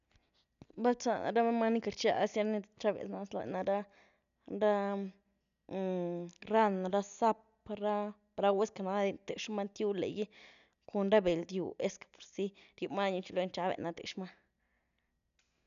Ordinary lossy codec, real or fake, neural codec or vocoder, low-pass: none; real; none; 7.2 kHz